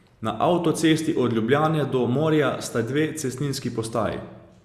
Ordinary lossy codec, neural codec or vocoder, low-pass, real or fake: Opus, 64 kbps; none; 14.4 kHz; real